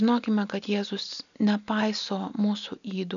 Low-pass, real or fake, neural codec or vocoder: 7.2 kHz; real; none